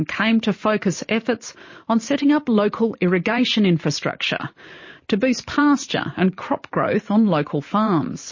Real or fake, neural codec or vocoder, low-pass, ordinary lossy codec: fake; vocoder, 44.1 kHz, 128 mel bands every 512 samples, BigVGAN v2; 7.2 kHz; MP3, 32 kbps